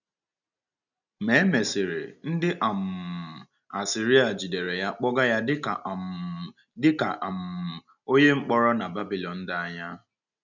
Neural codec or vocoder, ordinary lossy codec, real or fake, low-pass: none; none; real; 7.2 kHz